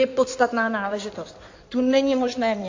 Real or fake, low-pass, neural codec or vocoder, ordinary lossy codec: fake; 7.2 kHz; codec, 16 kHz in and 24 kHz out, 2.2 kbps, FireRedTTS-2 codec; AAC, 48 kbps